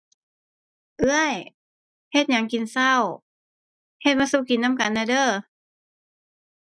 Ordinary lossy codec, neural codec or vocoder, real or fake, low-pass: none; none; real; none